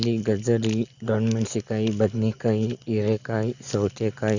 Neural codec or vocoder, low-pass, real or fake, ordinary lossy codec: none; 7.2 kHz; real; none